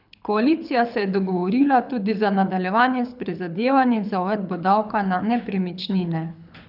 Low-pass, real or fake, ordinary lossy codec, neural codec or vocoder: 5.4 kHz; fake; none; codec, 24 kHz, 6 kbps, HILCodec